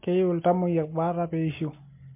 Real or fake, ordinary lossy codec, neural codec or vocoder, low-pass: real; MP3, 24 kbps; none; 3.6 kHz